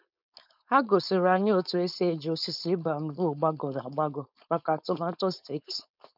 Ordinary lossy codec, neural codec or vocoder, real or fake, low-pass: none; codec, 16 kHz, 4.8 kbps, FACodec; fake; 5.4 kHz